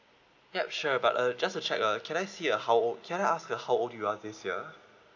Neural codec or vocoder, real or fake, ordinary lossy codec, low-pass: none; real; none; 7.2 kHz